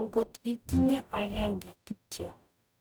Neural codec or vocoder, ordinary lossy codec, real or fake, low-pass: codec, 44.1 kHz, 0.9 kbps, DAC; none; fake; none